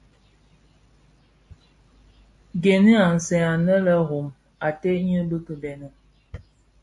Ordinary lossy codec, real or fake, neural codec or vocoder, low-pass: AAC, 64 kbps; real; none; 10.8 kHz